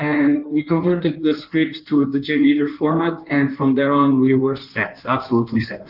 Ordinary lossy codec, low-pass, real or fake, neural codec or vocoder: Opus, 24 kbps; 5.4 kHz; fake; codec, 16 kHz in and 24 kHz out, 1.1 kbps, FireRedTTS-2 codec